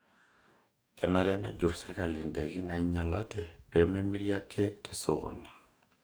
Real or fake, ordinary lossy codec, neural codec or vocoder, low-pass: fake; none; codec, 44.1 kHz, 2.6 kbps, DAC; none